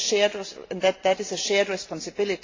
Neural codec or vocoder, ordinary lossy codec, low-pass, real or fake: none; AAC, 32 kbps; 7.2 kHz; real